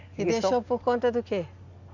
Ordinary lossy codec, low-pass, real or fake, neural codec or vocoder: none; 7.2 kHz; real; none